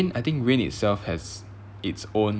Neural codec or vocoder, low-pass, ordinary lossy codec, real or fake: none; none; none; real